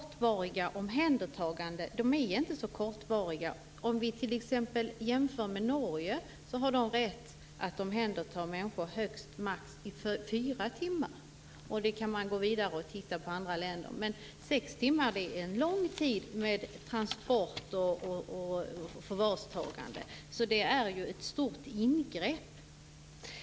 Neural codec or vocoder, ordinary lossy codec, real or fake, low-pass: none; none; real; none